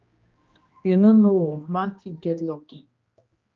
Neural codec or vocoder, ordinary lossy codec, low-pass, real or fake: codec, 16 kHz, 1 kbps, X-Codec, HuBERT features, trained on general audio; Opus, 24 kbps; 7.2 kHz; fake